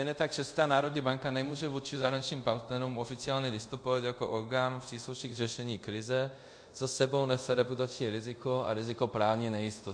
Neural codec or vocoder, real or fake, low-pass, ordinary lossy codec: codec, 24 kHz, 0.5 kbps, DualCodec; fake; 9.9 kHz; MP3, 48 kbps